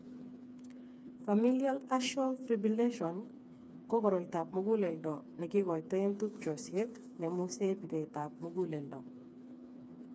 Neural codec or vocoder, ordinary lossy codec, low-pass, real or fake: codec, 16 kHz, 4 kbps, FreqCodec, smaller model; none; none; fake